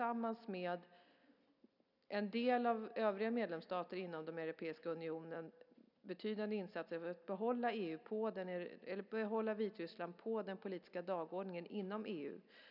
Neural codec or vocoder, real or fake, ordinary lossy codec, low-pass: none; real; none; 5.4 kHz